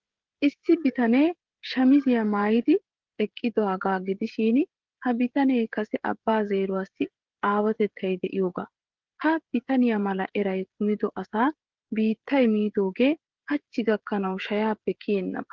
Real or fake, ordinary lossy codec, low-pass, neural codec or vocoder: fake; Opus, 16 kbps; 7.2 kHz; codec, 16 kHz, 16 kbps, FreqCodec, smaller model